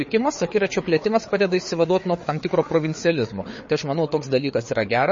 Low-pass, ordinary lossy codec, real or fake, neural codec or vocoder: 7.2 kHz; MP3, 32 kbps; fake; codec, 16 kHz, 4 kbps, FreqCodec, larger model